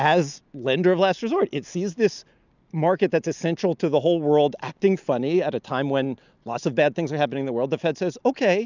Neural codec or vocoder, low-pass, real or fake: none; 7.2 kHz; real